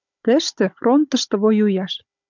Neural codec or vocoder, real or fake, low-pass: codec, 16 kHz, 16 kbps, FunCodec, trained on Chinese and English, 50 frames a second; fake; 7.2 kHz